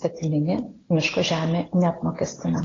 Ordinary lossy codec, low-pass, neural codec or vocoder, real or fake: AAC, 32 kbps; 7.2 kHz; none; real